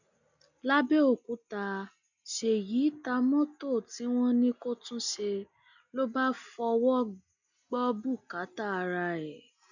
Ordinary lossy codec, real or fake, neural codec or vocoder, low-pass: none; real; none; 7.2 kHz